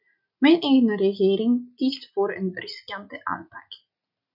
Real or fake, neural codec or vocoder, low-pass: real; none; 5.4 kHz